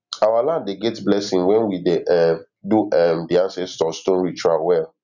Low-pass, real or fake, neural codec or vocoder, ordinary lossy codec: 7.2 kHz; real; none; none